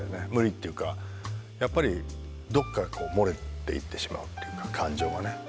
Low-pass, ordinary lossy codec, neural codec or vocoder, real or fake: none; none; none; real